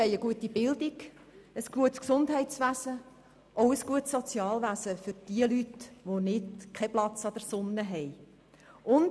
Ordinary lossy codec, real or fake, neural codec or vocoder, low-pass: none; real; none; none